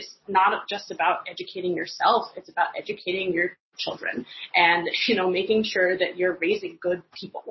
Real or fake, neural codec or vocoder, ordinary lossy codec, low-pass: real; none; MP3, 24 kbps; 7.2 kHz